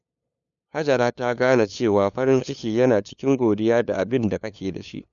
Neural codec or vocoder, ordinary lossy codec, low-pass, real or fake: codec, 16 kHz, 2 kbps, FunCodec, trained on LibriTTS, 25 frames a second; none; 7.2 kHz; fake